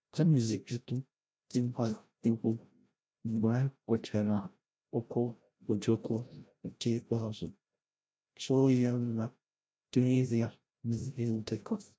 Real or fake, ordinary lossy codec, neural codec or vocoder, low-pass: fake; none; codec, 16 kHz, 0.5 kbps, FreqCodec, larger model; none